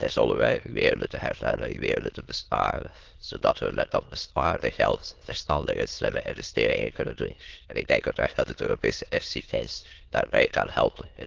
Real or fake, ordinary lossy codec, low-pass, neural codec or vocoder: fake; Opus, 16 kbps; 7.2 kHz; autoencoder, 22.05 kHz, a latent of 192 numbers a frame, VITS, trained on many speakers